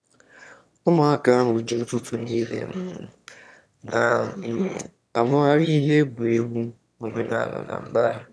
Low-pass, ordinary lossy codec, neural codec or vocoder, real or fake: none; none; autoencoder, 22.05 kHz, a latent of 192 numbers a frame, VITS, trained on one speaker; fake